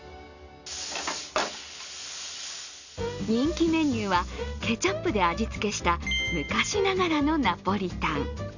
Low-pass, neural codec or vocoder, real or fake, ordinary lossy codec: 7.2 kHz; none; real; none